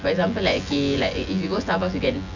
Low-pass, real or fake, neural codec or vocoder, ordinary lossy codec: 7.2 kHz; fake; vocoder, 24 kHz, 100 mel bands, Vocos; none